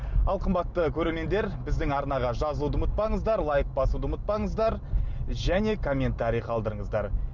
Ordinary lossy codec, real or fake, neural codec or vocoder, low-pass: none; real; none; 7.2 kHz